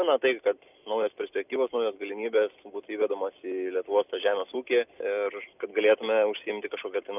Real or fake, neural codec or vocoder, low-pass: real; none; 3.6 kHz